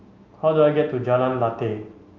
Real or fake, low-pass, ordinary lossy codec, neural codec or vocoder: real; 7.2 kHz; Opus, 24 kbps; none